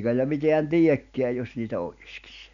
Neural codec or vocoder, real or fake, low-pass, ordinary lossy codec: none; real; 7.2 kHz; none